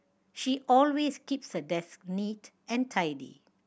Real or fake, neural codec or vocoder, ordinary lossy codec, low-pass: real; none; none; none